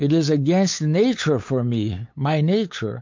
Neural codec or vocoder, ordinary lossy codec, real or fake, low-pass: codec, 16 kHz, 8 kbps, FunCodec, trained on LibriTTS, 25 frames a second; MP3, 48 kbps; fake; 7.2 kHz